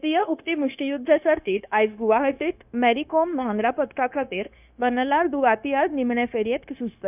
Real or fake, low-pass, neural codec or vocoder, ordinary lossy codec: fake; 3.6 kHz; codec, 16 kHz, 0.9 kbps, LongCat-Audio-Codec; none